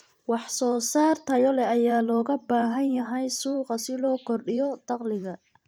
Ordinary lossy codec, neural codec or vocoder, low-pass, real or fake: none; vocoder, 44.1 kHz, 128 mel bands every 512 samples, BigVGAN v2; none; fake